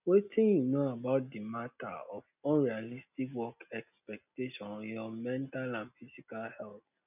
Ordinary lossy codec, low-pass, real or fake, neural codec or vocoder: none; 3.6 kHz; real; none